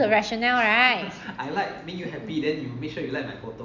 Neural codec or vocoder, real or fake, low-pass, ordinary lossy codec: none; real; 7.2 kHz; none